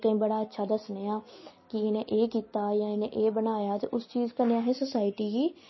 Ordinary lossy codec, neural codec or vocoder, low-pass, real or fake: MP3, 24 kbps; none; 7.2 kHz; real